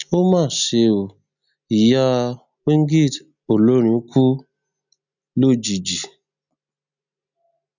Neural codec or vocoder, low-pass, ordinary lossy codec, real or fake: none; 7.2 kHz; none; real